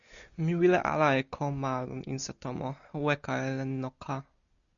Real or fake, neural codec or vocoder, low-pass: real; none; 7.2 kHz